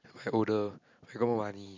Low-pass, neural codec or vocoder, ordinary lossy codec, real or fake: 7.2 kHz; none; MP3, 48 kbps; real